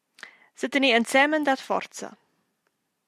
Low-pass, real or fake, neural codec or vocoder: 14.4 kHz; real; none